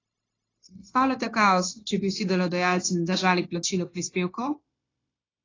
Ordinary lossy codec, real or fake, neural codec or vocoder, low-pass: AAC, 32 kbps; fake; codec, 16 kHz, 0.9 kbps, LongCat-Audio-Codec; 7.2 kHz